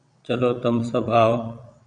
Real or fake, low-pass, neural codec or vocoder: fake; 9.9 kHz; vocoder, 22.05 kHz, 80 mel bands, WaveNeXt